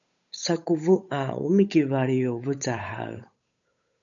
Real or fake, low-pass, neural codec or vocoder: fake; 7.2 kHz; codec, 16 kHz, 8 kbps, FunCodec, trained on Chinese and English, 25 frames a second